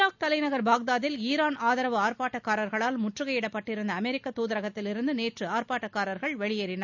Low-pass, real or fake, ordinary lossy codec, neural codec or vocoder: 7.2 kHz; real; none; none